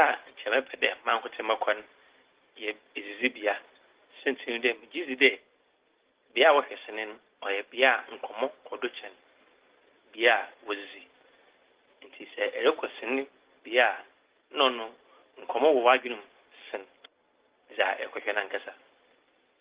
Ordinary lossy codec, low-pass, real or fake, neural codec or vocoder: Opus, 16 kbps; 3.6 kHz; real; none